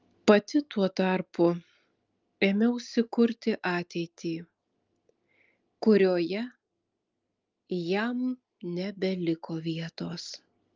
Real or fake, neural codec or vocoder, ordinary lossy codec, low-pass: real; none; Opus, 32 kbps; 7.2 kHz